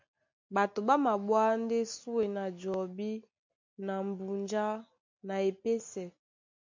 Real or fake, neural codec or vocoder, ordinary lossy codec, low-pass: real; none; MP3, 64 kbps; 7.2 kHz